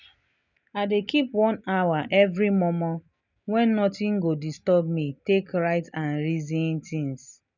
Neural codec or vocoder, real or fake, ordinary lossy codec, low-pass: none; real; none; 7.2 kHz